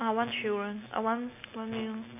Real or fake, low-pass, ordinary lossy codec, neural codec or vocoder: real; 3.6 kHz; none; none